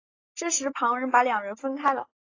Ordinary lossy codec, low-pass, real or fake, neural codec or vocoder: AAC, 32 kbps; 7.2 kHz; real; none